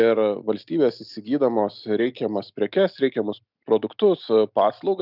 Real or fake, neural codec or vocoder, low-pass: real; none; 5.4 kHz